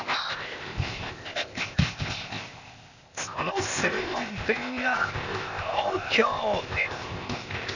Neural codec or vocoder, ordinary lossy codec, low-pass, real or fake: codec, 16 kHz, 0.8 kbps, ZipCodec; none; 7.2 kHz; fake